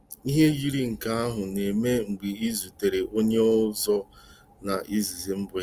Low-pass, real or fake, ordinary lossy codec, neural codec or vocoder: 14.4 kHz; real; Opus, 32 kbps; none